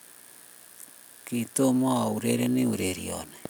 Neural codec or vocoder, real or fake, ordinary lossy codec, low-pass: none; real; none; none